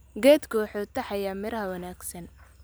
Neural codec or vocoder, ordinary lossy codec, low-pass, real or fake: none; none; none; real